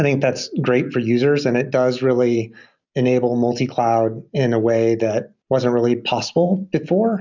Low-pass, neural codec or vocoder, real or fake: 7.2 kHz; none; real